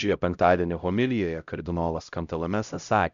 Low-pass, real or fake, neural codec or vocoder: 7.2 kHz; fake; codec, 16 kHz, 0.5 kbps, X-Codec, HuBERT features, trained on LibriSpeech